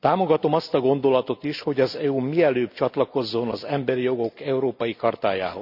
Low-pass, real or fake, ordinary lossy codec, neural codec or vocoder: 5.4 kHz; real; none; none